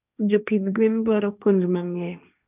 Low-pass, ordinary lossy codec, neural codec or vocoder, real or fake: 3.6 kHz; none; codec, 16 kHz, 1.1 kbps, Voila-Tokenizer; fake